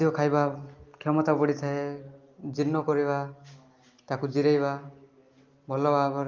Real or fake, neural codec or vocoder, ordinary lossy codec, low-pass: real; none; Opus, 32 kbps; 7.2 kHz